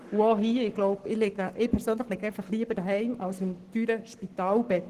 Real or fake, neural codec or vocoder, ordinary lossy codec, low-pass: fake; codec, 44.1 kHz, 7.8 kbps, Pupu-Codec; Opus, 16 kbps; 14.4 kHz